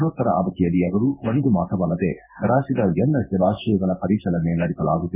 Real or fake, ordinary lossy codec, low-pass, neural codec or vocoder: fake; none; 3.6 kHz; codec, 16 kHz in and 24 kHz out, 1 kbps, XY-Tokenizer